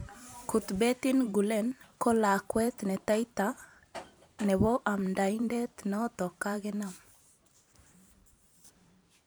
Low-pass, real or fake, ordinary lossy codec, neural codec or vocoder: none; fake; none; vocoder, 44.1 kHz, 128 mel bands every 512 samples, BigVGAN v2